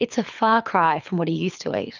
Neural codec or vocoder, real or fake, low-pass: codec, 24 kHz, 6 kbps, HILCodec; fake; 7.2 kHz